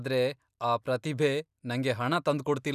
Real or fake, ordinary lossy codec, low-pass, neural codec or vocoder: real; none; 14.4 kHz; none